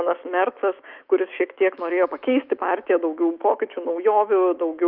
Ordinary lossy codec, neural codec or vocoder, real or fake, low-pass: Opus, 24 kbps; autoencoder, 48 kHz, 128 numbers a frame, DAC-VAE, trained on Japanese speech; fake; 5.4 kHz